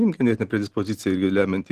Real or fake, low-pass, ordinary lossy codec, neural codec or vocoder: real; 14.4 kHz; Opus, 16 kbps; none